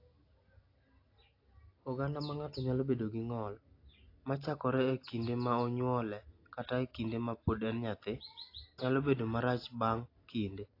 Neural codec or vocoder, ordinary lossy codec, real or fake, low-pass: none; AAC, 32 kbps; real; 5.4 kHz